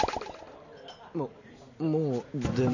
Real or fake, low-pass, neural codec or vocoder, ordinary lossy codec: real; 7.2 kHz; none; none